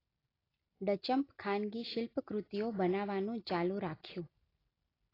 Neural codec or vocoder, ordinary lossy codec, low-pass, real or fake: none; AAC, 24 kbps; 5.4 kHz; real